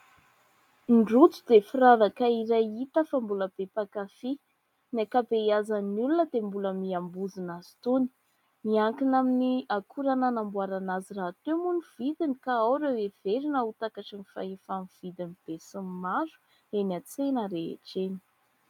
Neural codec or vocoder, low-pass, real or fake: none; 19.8 kHz; real